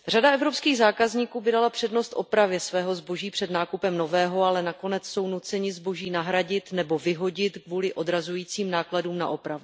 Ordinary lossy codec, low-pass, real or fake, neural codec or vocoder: none; none; real; none